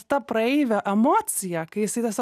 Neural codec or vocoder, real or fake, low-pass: none; real; 14.4 kHz